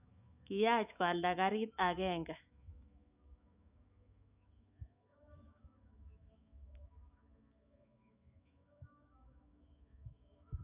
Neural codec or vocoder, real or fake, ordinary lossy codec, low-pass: autoencoder, 48 kHz, 128 numbers a frame, DAC-VAE, trained on Japanese speech; fake; none; 3.6 kHz